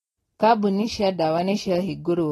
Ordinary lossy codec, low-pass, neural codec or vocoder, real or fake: AAC, 32 kbps; 19.8 kHz; none; real